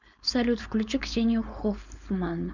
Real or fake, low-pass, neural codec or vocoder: fake; 7.2 kHz; codec, 16 kHz, 4.8 kbps, FACodec